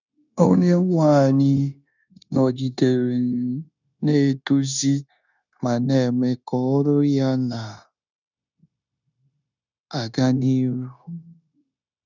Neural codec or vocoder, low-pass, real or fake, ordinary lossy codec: codec, 16 kHz, 0.9 kbps, LongCat-Audio-Codec; 7.2 kHz; fake; none